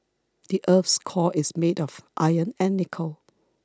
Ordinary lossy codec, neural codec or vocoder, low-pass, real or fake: none; none; none; real